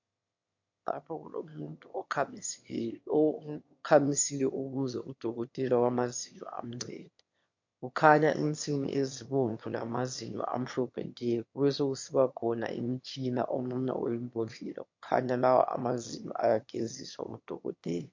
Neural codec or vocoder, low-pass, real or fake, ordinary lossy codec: autoencoder, 22.05 kHz, a latent of 192 numbers a frame, VITS, trained on one speaker; 7.2 kHz; fake; MP3, 48 kbps